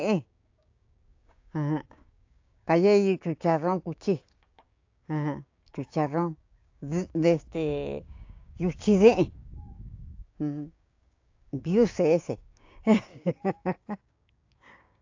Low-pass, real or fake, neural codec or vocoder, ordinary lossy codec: 7.2 kHz; fake; codec, 16 kHz, 6 kbps, DAC; AAC, 48 kbps